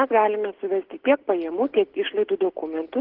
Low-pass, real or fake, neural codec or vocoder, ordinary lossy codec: 5.4 kHz; real; none; Opus, 16 kbps